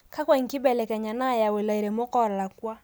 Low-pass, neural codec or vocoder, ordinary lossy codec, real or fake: none; none; none; real